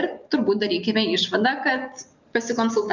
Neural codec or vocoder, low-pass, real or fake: none; 7.2 kHz; real